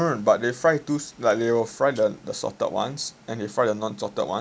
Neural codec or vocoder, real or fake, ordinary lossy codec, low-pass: none; real; none; none